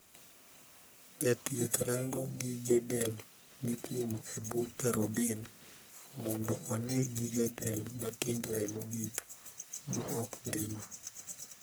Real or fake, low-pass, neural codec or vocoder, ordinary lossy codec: fake; none; codec, 44.1 kHz, 1.7 kbps, Pupu-Codec; none